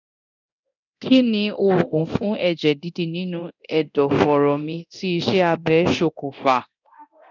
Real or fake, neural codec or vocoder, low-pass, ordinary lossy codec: fake; codec, 24 kHz, 0.9 kbps, DualCodec; 7.2 kHz; none